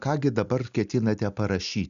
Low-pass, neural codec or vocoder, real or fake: 7.2 kHz; none; real